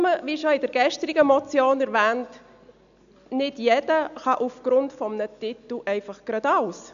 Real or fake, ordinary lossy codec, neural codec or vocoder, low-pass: real; none; none; 7.2 kHz